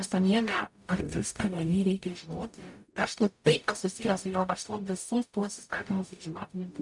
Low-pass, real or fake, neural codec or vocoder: 10.8 kHz; fake; codec, 44.1 kHz, 0.9 kbps, DAC